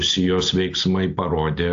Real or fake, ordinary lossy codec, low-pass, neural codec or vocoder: real; AAC, 48 kbps; 7.2 kHz; none